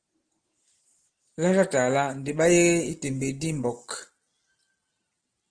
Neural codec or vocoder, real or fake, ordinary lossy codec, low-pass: none; real; Opus, 16 kbps; 9.9 kHz